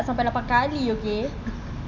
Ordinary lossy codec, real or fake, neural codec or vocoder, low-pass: none; real; none; 7.2 kHz